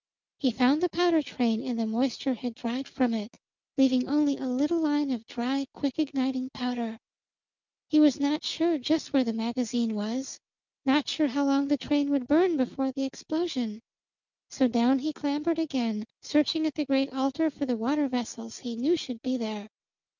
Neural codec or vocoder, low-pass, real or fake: none; 7.2 kHz; real